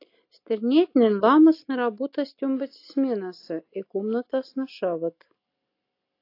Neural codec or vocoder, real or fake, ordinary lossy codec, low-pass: none; real; AAC, 32 kbps; 5.4 kHz